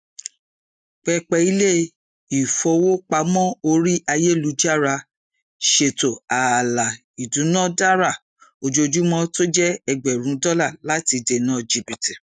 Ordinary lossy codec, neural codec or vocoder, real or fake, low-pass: none; none; real; 9.9 kHz